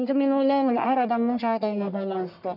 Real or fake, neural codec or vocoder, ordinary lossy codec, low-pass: fake; codec, 44.1 kHz, 1.7 kbps, Pupu-Codec; none; 5.4 kHz